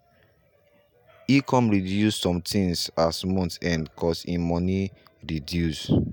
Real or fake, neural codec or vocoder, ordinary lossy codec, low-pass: real; none; none; none